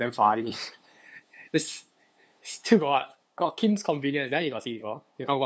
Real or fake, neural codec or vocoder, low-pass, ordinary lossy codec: fake; codec, 16 kHz, 2 kbps, FunCodec, trained on LibriTTS, 25 frames a second; none; none